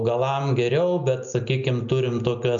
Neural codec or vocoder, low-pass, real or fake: none; 7.2 kHz; real